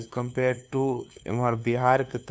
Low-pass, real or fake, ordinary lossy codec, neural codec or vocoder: none; fake; none; codec, 16 kHz, 2 kbps, FunCodec, trained on LibriTTS, 25 frames a second